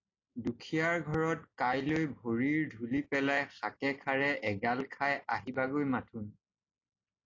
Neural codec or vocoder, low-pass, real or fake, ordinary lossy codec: none; 7.2 kHz; real; AAC, 32 kbps